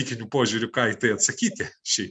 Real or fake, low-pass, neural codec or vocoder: real; 9.9 kHz; none